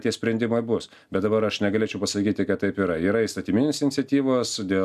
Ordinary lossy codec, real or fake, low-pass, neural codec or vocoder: AAC, 96 kbps; real; 14.4 kHz; none